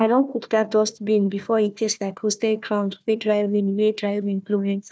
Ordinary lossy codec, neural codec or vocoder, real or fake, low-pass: none; codec, 16 kHz, 1 kbps, FunCodec, trained on Chinese and English, 50 frames a second; fake; none